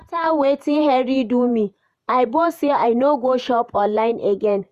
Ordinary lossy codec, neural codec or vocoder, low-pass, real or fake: Opus, 64 kbps; vocoder, 48 kHz, 128 mel bands, Vocos; 14.4 kHz; fake